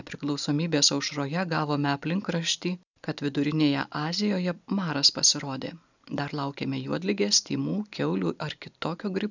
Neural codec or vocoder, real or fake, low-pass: none; real; 7.2 kHz